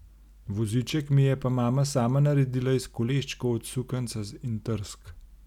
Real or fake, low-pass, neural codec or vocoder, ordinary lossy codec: real; 19.8 kHz; none; none